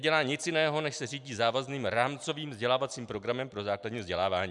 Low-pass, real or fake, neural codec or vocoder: 10.8 kHz; real; none